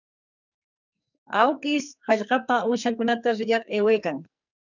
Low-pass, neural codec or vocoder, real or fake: 7.2 kHz; codec, 44.1 kHz, 2.6 kbps, SNAC; fake